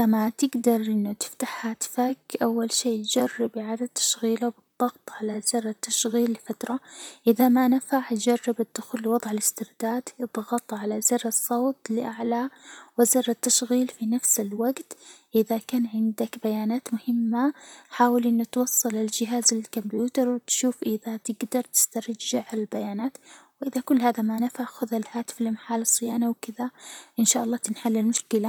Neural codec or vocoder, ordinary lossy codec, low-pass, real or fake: vocoder, 44.1 kHz, 128 mel bands, Pupu-Vocoder; none; none; fake